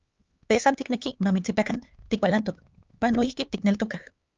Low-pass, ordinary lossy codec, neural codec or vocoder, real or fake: 7.2 kHz; Opus, 24 kbps; codec, 16 kHz, 4 kbps, X-Codec, HuBERT features, trained on LibriSpeech; fake